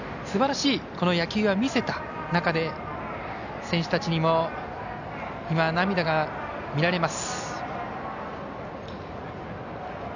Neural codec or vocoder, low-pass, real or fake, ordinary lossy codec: none; 7.2 kHz; real; none